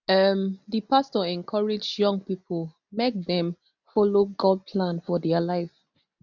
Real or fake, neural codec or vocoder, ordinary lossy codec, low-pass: real; none; none; 7.2 kHz